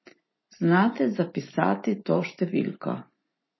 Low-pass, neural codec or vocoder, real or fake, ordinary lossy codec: 7.2 kHz; none; real; MP3, 24 kbps